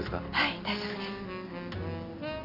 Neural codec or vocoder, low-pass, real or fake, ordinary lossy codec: none; 5.4 kHz; real; none